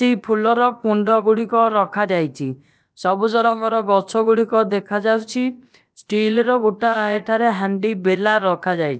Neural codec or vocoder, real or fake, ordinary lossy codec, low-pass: codec, 16 kHz, about 1 kbps, DyCAST, with the encoder's durations; fake; none; none